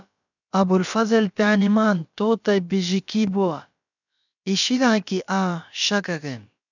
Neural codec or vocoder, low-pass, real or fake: codec, 16 kHz, about 1 kbps, DyCAST, with the encoder's durations; 7.2 kHz; fake